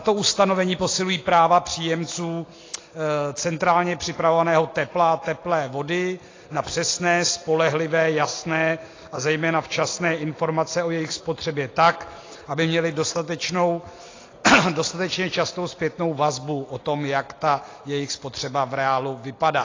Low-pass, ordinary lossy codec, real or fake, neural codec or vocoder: 7.2 kHz; AAC, 32 kbps; real; none